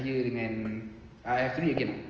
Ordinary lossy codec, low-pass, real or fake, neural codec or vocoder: Opus, 24 kbps; 7.2 kHz; real; none